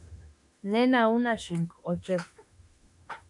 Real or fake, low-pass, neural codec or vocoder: fake; 10.8 kHz; autoencoder, 48 kHz, 32 numbers a frame, DAC-VAE, trained on Japanese speech